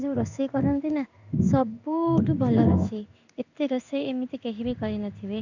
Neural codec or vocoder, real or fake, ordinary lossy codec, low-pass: codec, 16 kHz in and 24 kHz out, 1 kbps, XY-Tokenizer; fake; none; 7.2 kHz